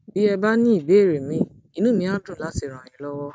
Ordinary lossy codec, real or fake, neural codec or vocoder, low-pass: none; real; none; none